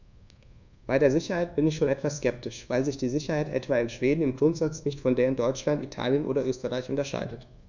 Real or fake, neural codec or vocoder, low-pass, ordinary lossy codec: fake; codec, 24 kHz, 1.2 kbps, DualCodec; 7.2 kHz; none